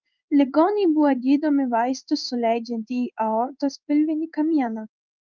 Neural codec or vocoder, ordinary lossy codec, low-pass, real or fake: codec, 16 kHz in and 24 kHz out, 1 kbps, XY-Tokenizer; Opus, 24 kbps; 7.2 kHz; fake